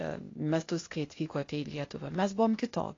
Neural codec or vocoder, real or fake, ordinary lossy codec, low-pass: codec, 16 kHz, 0.8 kbps, ZipCodec; fake; AAC, 32 kbps; 7.2 kHz